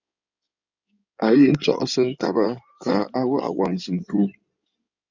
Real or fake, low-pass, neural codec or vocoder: fake; 7.2 kHz; codec, 16 kHz in and 24 kHz out, 2.2 kbps, FireRedTTS-2 codec